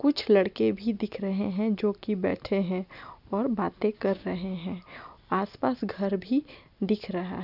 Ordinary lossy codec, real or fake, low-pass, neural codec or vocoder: none; real; 5.4 kHz; none